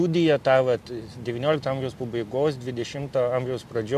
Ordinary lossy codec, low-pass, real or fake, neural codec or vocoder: MP3, 64 kbps; 14.4 kHz; real; none